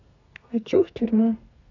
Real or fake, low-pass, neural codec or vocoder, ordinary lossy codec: fake; 7.2 kHz; codec, 32 kHz, 1.9 kbps, SNAC; none